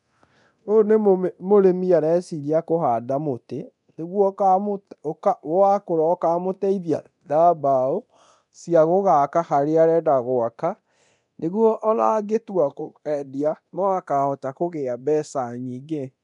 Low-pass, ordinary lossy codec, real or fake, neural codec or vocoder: 10.8 kHz; none; fake; codec, 24 kHz, 0.9 kbps, DualCodec